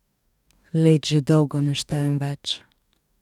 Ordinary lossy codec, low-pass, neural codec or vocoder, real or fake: none; 19.8 kHz; codec, 44.1 kHz, 2.6 kbps, DAC; fake